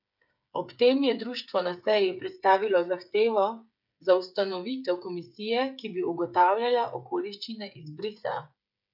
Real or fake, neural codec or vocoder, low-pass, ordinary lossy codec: fake; codec, 16 kHz, 8 kbps, FreqCodec, smaller model; 5.4 kHz; none